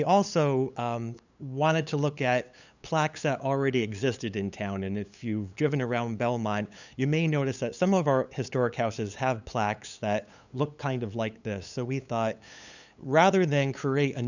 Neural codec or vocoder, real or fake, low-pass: codec, 16 kHz, 8 kbps, FunCodec, trained on LibriTTS, 25 frames a second; fake; 7.2 kHz